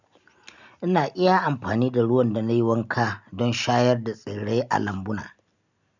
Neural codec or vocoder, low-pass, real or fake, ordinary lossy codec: none; 7.2 kHz; real; none